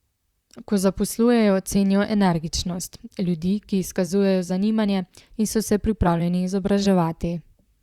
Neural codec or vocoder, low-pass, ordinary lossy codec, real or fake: vocoder, 44.1 kHz, 128 mel bands, Pupu-Vocoder; 19.8 kHz; Opus, 64 kbps; fake